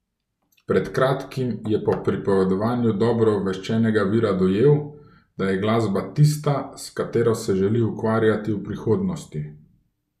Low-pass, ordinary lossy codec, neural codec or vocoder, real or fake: 14.4 kHz; none; none; real